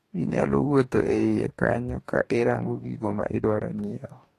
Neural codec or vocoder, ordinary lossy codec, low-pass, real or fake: codec, 44.1 kHz, 2.6 kbps, DAC; AAC, 48 kbps; 14.4 kHz; fake